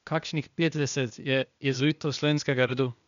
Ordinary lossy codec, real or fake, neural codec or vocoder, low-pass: none; fake; codec, 16 kHz, 0.8 kbps, ZipCodec; 7.2 kHz